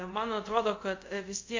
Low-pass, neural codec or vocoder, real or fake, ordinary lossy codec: 7.2 kHz; codec, 24 kHz, 0.5 kbps, DualCodec; fake; MP3, 48 kbps